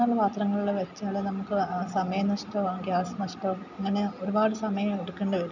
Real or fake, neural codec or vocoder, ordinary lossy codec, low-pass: real; none; none; 7.2 kHz